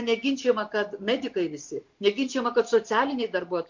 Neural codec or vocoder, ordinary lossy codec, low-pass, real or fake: none; MP3, 48 kbps; 7.2 kHz; real